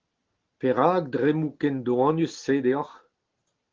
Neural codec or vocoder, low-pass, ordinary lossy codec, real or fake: none; 7.2 kHz; Opus, 16 kbps; real